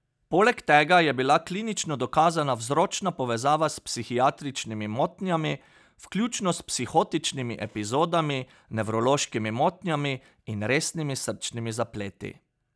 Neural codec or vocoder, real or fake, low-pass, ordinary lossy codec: none; real; none; none